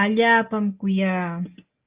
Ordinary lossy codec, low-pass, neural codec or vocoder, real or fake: Opus, 24 kbps; 3.6 kHz; none; real